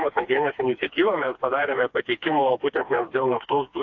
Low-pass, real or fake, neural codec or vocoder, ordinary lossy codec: 7.2 kHz; fake; codec, 16 kHz, 2 kbps, FreqCodec, smaller model; AAC, 48 kbps